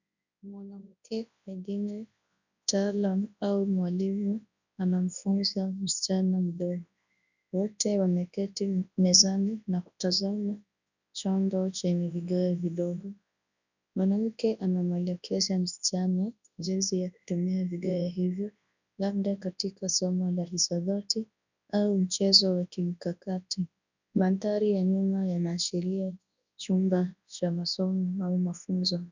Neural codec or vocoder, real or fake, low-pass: codec, 24 kHz, 0.9 kbps, WavTokenizer, large speech release; fake; 7.2 kHz